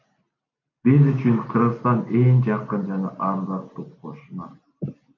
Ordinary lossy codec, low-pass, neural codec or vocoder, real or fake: MP3, 48 kbps; 7.2 kHz; none; real